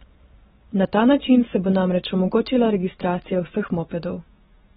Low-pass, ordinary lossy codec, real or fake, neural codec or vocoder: 19.8 kHz; AAC, 16 kbps; fake; vocoder, 44.1 kHz, 128 mel bands every 256 samples, BigVGAN v2